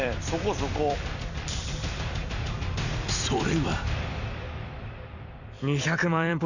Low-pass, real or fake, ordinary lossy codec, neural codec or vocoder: 7.2 kHz; fake; none; vocoder, 44.1 kHz, 128 mel bands every 256 samples, BigVGAN v2